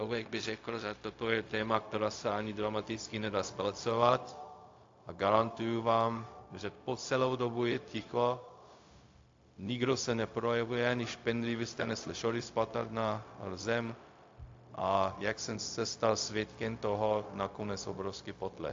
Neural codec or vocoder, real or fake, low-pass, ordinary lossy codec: codec, 16 kHz, 0.4 kbps, LongCat-Audio-Codec; fake; 7.2 kHz; AAC, 48 kbps